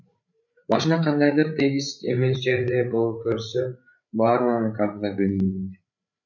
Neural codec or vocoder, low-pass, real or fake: codec, 16 kHz, 8 kbps, FreqCodec, larger model; 7.2 kHz; fake